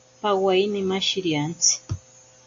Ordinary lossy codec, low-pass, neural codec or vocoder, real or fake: AAC, 48 kbps; 7.2 kHz; none; real